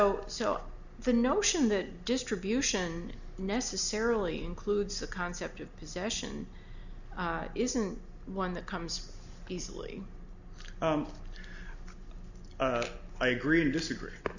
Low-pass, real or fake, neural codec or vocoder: 7.2 kHz; real; none